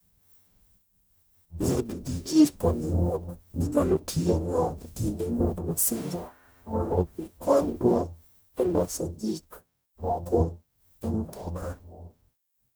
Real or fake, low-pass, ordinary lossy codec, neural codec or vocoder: fake; none; none; codec, 44.1 kHz, 0.9 kbps, DAC